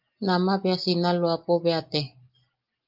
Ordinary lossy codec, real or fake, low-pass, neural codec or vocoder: Opus, 24 kbps; real; 5.4 kHz; none